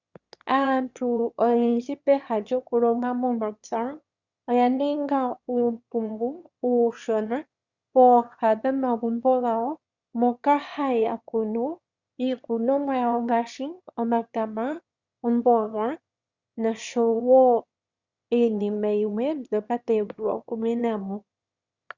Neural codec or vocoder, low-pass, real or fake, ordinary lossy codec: autoencoder, 22.05 kHz, a latent of 192 numbers a frame, VITS, trained on one speaker; 7.2 kHz; fake; Opus, 64 kbps